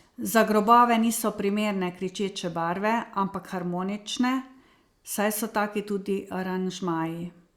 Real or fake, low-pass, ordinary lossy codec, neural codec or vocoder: real; 19.8 kHz; Opus, 64 kbps; none